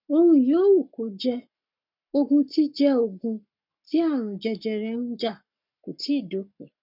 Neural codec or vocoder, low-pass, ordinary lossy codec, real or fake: codec, 44.1 kHz, 7.8 kbps, Pupu-Codec; 5.4 kHz; none; fake